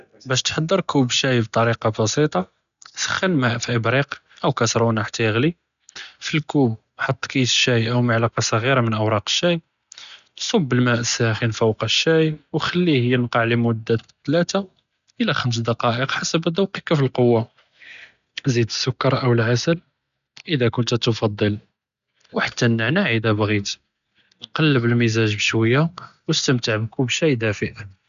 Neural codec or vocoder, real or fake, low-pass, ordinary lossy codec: none; real; 7.2 kHz; none